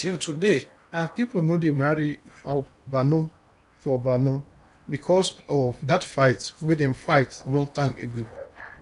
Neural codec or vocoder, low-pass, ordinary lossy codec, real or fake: codec, 16 kHz in and 24 kHz out, 0.8 kbps, FocalCodec, streaming, 65536 codes; 10.8 kHz; none; fake